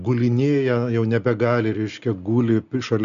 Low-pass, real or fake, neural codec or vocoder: 7.2 kHz; real; none